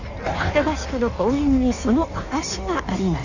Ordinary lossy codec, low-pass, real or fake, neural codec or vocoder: none; 7.2 kHz; fake; codec, 16 kHz in and 24 kHz out, 1.1 kbps, FireRedTTS-2 codec